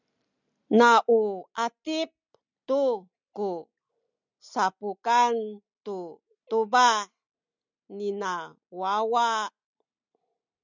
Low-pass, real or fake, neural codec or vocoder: 7.2 kHz; real; none